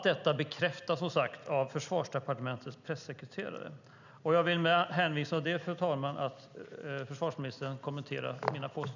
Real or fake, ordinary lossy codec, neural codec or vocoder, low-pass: real; none; none; 7.2 kHz